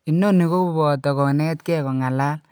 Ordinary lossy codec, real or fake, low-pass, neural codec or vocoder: none; real; none; none